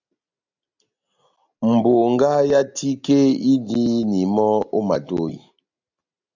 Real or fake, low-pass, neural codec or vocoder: real; 7.2 kHz; none